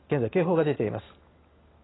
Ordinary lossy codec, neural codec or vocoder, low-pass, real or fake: AAC, 16 kbps; none; 7.2 kHz; real